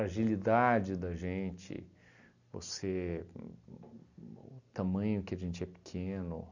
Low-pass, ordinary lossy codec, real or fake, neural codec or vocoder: 7.2 kHz; none; real; none